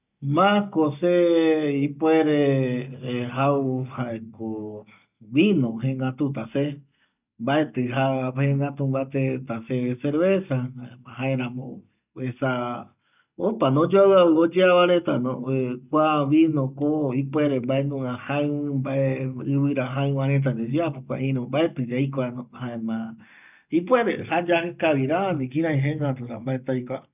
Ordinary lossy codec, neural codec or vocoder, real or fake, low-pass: none; none; real; 3.6 kHz